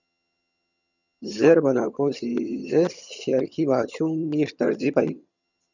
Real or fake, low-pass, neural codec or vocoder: fake; 7.2 kHz; vocoder, 22.05 kHz, 80 mel bands, HiFi-GAN